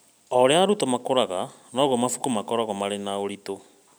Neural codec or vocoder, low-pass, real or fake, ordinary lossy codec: none; none; real; none